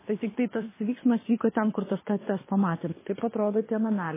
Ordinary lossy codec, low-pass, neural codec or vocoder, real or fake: MP3, 16 kbps; 3.6 kHz; codec, 24 kHz, 6 kbps, HILCodec; fake